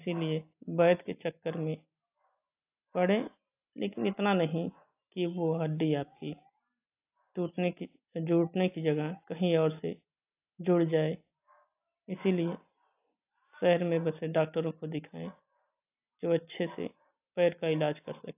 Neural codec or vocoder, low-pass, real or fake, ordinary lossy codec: none; 3.6 kHz; real; AAC, 32 kbps